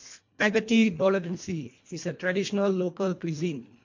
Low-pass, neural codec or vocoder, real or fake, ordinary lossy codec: 7.2 kHz; codec, 24 kHz, 1.5 kbps, HILCodec; fake; MP3, 48 kbps